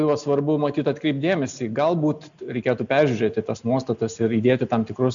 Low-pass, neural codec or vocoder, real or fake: 7.2 kHz; none; real